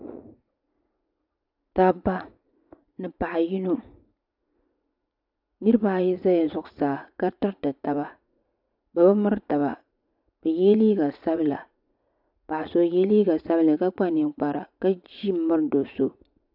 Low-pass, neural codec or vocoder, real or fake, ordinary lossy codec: 5.4 kHz; vocoder, 44.1 kHz, 80 mel bands, Vocos; fake; AAC, 48 kbps